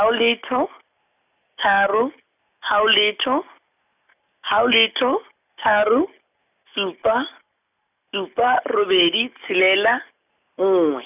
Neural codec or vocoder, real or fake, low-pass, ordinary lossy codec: none; real; 3.6 kHz; none